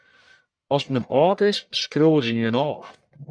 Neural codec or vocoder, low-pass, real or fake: codec, 44.1 kHz, 1.7 kbps, Pupu-Codec; 9.9 kHz; fake